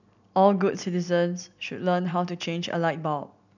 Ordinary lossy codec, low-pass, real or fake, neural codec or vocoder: none; 7.2 kHz; real; none